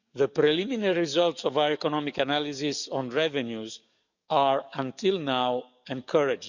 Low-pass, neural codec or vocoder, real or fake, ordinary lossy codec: 7.2 kHz; codec, 44.1 kHz, 7.8 kbps, DAC; fake; none